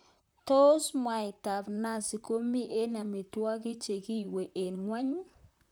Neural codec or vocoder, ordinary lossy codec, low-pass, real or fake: vocoder, 44.1 kHz, 128 mel bands, Pupu-Vocoder; none; none; fake